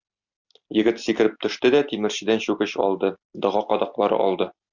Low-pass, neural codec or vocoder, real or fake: 7.2 kHz; none; real